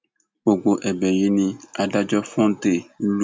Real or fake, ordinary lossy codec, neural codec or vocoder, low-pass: real; none; none; none